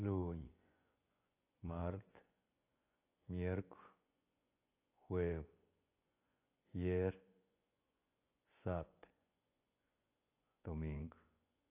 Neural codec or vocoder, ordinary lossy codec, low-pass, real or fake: vocoder, 24 kHz, 100 mel bands, Vocos; AAC, 24 kbps; 3.6 kHz; fake